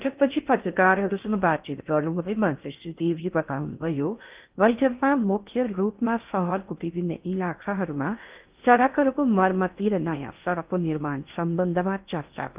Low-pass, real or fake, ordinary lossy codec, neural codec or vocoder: 3.6 kHz; fake; Opus, 24 kbps; codec, 16 kHz in and 24 kHz out, 0.6 kbps, FocalCodec, streaming, 4096 codes